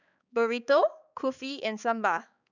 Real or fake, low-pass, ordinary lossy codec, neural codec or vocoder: fake; 7.2 kHz; none; codec, 16 kHz, 4 kbps, X-Codec, HuBERT features, trained on balanced general audio